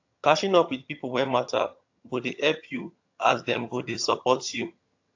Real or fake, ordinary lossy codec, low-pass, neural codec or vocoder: fake; AAC, 48 kbps; 7.2 kHz; vocoder, 22.05 kHz, 80 mel bands, HiFi-GAN